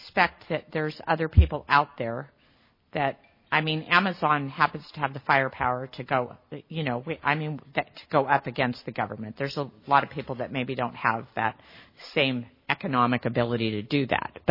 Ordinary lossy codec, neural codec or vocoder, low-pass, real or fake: MP3, 24 kbps; none; 5.4 kHz; real